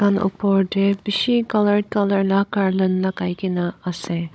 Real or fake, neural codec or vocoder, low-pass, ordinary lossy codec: fake; codec, 16 kHz, 16 kbps, FunCodec, trained on Chinese and English, 50 frames a second; none; none